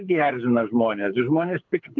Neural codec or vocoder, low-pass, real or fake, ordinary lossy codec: codec, 24 kHz, 6 kbps, HILCodec; 7.2 kHz; fake; MP3, 64 kbps